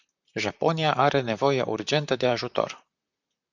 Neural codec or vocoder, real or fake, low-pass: vocoder, 44.1 kHz, 128 mel bands, Pupu-Vocoder; fake; 7.2 kHz